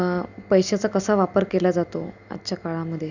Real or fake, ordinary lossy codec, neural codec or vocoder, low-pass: real; none; none; 7.2 kHz